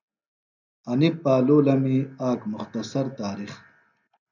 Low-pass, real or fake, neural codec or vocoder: 7.2 kHz; real; none